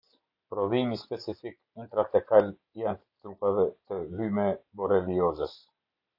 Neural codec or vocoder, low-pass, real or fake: none; 5.4 kHz; real